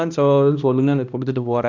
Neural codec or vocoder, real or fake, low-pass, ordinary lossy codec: codec, 16 kHz, 1 kbps, X-Codec, HuBERT features, trained on balanced general audio; fake; 7.2 kHz; none